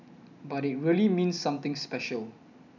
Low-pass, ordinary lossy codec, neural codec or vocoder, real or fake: 7.2 kHz; none; none; real